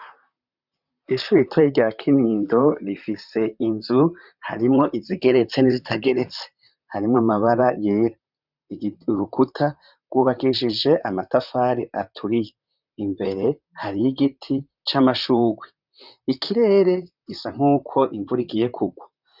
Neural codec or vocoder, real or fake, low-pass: vocoder, 44.1 kHz, 128 mel bands, Pupu-Vocoder; fake; 5.4 kHz